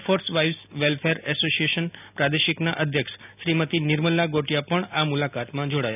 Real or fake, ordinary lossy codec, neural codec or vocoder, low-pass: real; none; none; 3.6 kHz